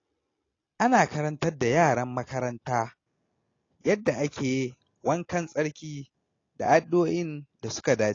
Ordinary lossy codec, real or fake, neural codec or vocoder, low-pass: AAC, 32 kbps; real; none; 7.2 kHz